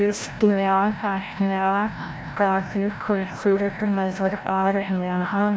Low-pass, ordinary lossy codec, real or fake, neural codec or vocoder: none; none; fake; codec, 16 kHz, 0.5 kbps, FreqCodec, larger model